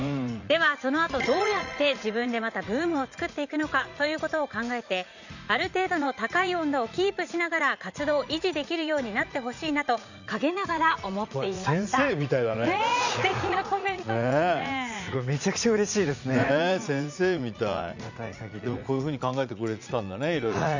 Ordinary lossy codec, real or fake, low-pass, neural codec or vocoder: none; fake; 7.2 kHz; vocoder, 44.1 kHz, 80 mel bands, Vocos